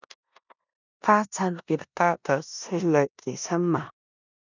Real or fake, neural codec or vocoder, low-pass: fake; codec, 16 kHz in and 24 kHz out, 0.9 kbps, LongCat-Audio-Codec, four codebook decoder; 7.2 kHz